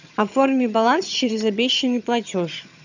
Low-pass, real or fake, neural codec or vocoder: 7.2 kHz; fake; vocoder, 22.05 kHz, 80 mel bands, HiFi-GAN